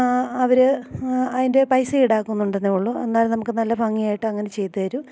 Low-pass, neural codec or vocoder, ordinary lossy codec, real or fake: none; none; none; real